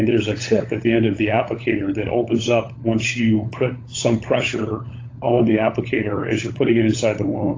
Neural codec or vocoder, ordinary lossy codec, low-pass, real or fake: codec, 16 kHz, 16 kbps, FunCodec, trained on LibriTTS, 50 frames a second; AAC, 32 kbps; 7.2 kHz; fake